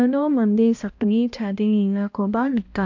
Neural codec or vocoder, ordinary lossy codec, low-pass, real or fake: codec, 16 kHz, 1 kbps, X-Codec, HuBERT features, trained on balanced general audio; AAC, 48 kbps; 7.2 kHz; fake